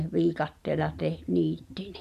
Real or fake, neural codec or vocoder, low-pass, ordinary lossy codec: real; none; 14.4 kHz; none